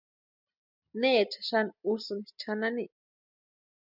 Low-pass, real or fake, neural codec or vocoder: 5.4 kHz; real; none